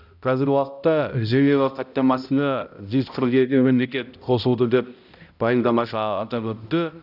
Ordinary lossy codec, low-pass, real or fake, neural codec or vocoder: none; 5.4 kHz; fake; codec, 16 kHz, 0.5 kbps, X-Codec, HuBERT features, trained on balanced general audio